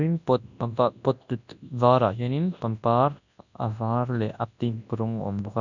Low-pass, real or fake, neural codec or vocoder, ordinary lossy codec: 7.2 kHz; fake; codec, 24 kHz, 0.9 kbps, WavTokenizer, large speech release; none